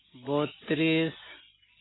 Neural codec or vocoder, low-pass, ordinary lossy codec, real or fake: none; 7.2 kHz; AAC, 16 kbps; real